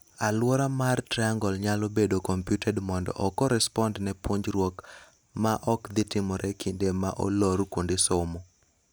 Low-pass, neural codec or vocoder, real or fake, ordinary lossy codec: none; none; real; none